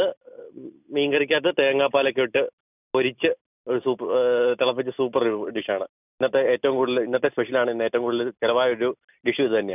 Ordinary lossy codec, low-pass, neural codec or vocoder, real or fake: none; 3.6 kHz; none; real